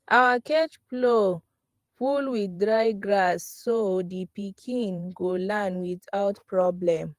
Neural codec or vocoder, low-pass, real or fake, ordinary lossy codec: vocoder, 48 kHz, 128 mel bands, Vocos; 14.4 kHz; fake; Opus, 24 kbps